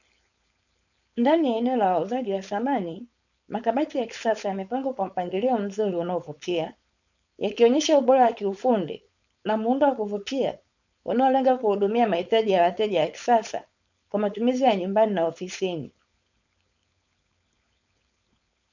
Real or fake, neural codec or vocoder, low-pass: fake; codec, 16 kHz, 4.8 kbps, FACodec; 7.2 kHz